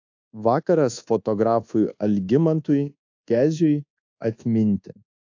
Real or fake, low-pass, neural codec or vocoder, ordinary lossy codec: fake; 7.2 kHz; codec, 24 kHz, 1.2 kbps, DualCodec; AAC, 48 kbps